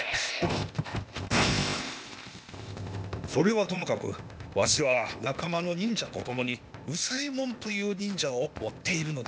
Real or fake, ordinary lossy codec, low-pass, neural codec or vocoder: fake; none; none; codec, 16 kHz, 0.8 kbps, ZipCodec